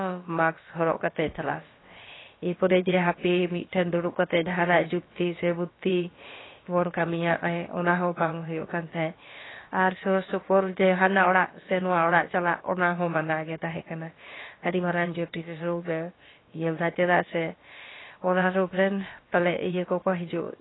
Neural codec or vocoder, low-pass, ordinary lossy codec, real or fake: codec, 16 kHz, about 1 kbps, DyCAST, with the encoder's durations; 7.2 kHz; AAC, 16 kbps; fake